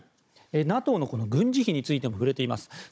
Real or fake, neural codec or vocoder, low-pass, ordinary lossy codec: fake; codec, 16 kHz, 16 kbps, FunCodec, trained on Chinese and English, 50 frames a second; none; none